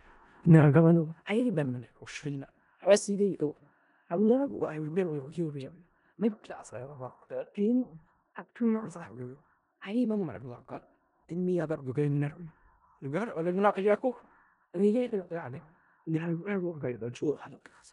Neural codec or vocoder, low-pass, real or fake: codec, 16 kHz in and 24 kHz out, 0.4 kbps, LongCat-Audio-Codec, four codebook decoder; 10.8 kHz; fake